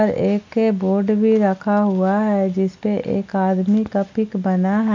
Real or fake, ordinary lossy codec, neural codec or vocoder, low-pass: real; MP3, 64 kbps; none; 7.2 kHz